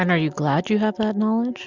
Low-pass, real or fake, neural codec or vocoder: 7.2 kHz; real; none